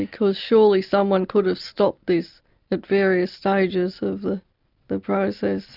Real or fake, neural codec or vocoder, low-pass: real; none; 5.4 kHz